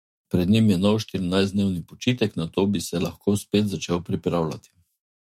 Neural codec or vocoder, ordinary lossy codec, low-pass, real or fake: autoencoder, 48 kHz, 128 numbers a frame, DAC-VAE, trained on Japanese speech; MP3, 64 kbps; 19.8 kHz; fake